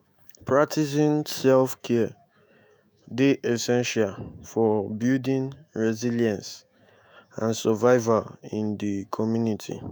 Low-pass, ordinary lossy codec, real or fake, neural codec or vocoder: none; none; fake; autoencoder, 48 kHz, 128 numbers a frame, DAC-VAE, trained on Japanese speech